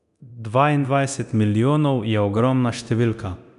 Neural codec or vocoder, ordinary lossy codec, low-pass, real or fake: codec, 24 kHz, 0.9 kbps, DualCodec; none; 10.8 kHz; fake